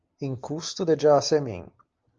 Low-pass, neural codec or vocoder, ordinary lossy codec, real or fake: 7.2 kHz; none; Opus, 24 kbps; real